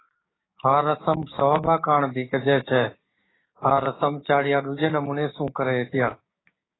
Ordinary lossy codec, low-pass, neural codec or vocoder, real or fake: AAC, 16 kbps; 7.2 kHz; codec, 16 kHz, 6 kbps, DAC; fake